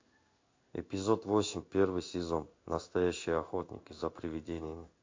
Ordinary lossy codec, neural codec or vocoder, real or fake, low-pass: MP3, 48 kbps; codec, 16 kHz in and 24 kHz out, 1 kbps, XY-Tokenizer; fake; 7.2 kHz